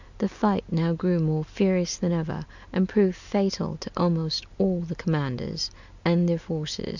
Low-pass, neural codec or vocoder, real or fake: 7.2 kHz; none; real